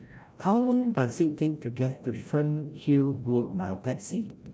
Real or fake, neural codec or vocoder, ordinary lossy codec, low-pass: fake; codec, 16 kHz, 0.5 kbps, FreqCodec, larger model; none; none